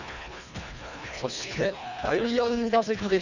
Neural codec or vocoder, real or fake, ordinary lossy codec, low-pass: codec, 24 kHz, 1.5 kbps, HILCodec; fake; none; 7.2 kHz